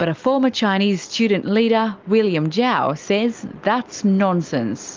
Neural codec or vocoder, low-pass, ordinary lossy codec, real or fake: none; 7.2 kHz; Opus, 32 kbps; real